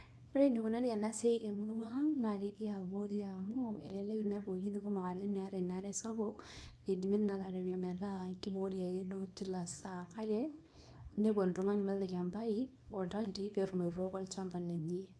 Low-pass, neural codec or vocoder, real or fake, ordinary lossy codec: none; codec, 24 kHz, 0.9 kbps, WavTokenizer, small release; fake; none